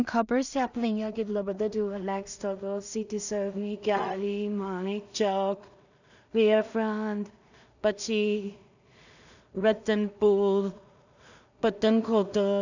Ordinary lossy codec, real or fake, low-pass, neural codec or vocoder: none; fake; 7.2 kHz; codec, 16 kHz in and 24 kHz out, 0.4 kbps, LongCat-Audio-Codec, two codebook decoder